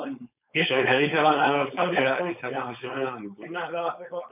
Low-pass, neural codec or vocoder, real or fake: 3.6 kHz; codec, 16 kHz, 4.8 kbps, FACodec; fake